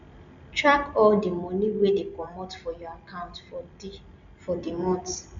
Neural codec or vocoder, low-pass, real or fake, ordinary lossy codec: none; 7.2 kHz; real; none